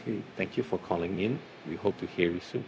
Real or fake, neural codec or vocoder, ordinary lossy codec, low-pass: fake; codec, 16 kHz, 0.4 kbps, LongCat-Audio-Codec; none; none